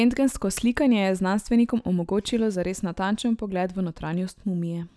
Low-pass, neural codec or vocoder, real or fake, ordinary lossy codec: none; none; real; none